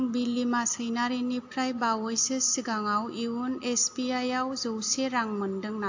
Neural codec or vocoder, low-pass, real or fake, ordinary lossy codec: none; 7.2 kHz; real; AAC, 48 kbps